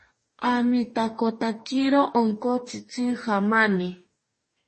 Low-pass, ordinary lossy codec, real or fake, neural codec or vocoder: 10.8 kHz; MP3, 32 kbps; fake; codec, 44.1 kHz, 2.6 kbps, DAC